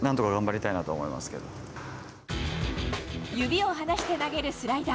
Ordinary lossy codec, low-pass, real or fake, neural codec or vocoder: none; none; real; none